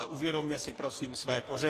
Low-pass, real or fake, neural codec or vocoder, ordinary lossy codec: 14.4 kHz; fake; codec, 44.1 kHz, 2.6 kbps, DAC; AAC, 48 kbps